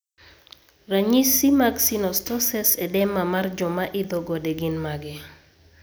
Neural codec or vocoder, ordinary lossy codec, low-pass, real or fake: none; none; none; real